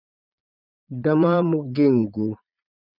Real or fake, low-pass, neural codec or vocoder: fake; 5.4 kHz; vocoder, 22.05 kHz, 80 mel bands, Vocos